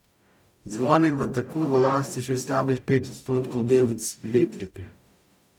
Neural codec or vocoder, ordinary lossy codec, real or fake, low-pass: codec, 44.1 kHz, 0.9 kbps, DAC; none; fake; 19.8 kHz